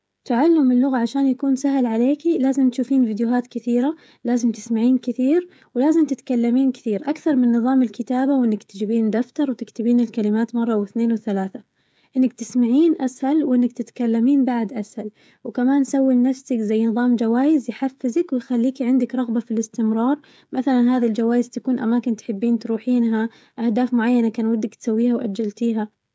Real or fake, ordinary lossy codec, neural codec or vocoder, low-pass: fake; none; codec, 16 kHz, 16 kbps, FreqCodec, smaller model; none